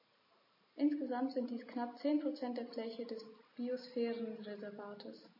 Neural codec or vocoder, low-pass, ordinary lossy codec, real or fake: none; 5.4 kHz; MP3, 24 kbps; real